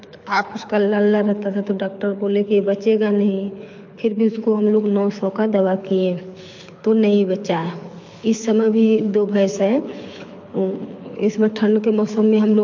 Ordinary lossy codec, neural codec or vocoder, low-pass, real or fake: MP3, 48 kbps; codec, 24 kHz, 6 kbps, HILCodec; 7.2 kHz; fake